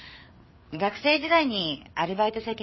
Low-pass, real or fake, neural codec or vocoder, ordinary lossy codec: 7.2 kHz; fake; codec, 16 kHz, 4 kbps, FreqCodec, larger model; MP3, 24 kbps